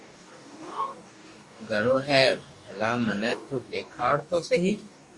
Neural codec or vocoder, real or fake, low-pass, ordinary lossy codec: codec, 44.1 kHz, 2.6 kbps, DAC; fake; 10.8 kHz; Opus, 64 kbps